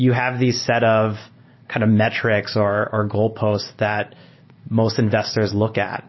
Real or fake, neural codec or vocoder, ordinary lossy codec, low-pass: real; none; MP3, 24 kbps; 7.2 kHz